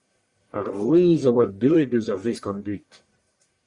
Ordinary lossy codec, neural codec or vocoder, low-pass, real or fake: Opus, 64 kbps; codec, 44.1 kHz, 1.7 kbps, Pupu-Codec; 10.8 kHz; fake